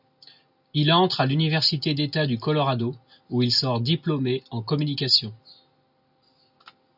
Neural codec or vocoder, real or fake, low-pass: none; real; 5.4 kHz